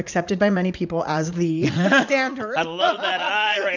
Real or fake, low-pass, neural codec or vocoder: fake; 7.2 kHz; vocoder, 44.1 kHz, 80 mel bands, Vocos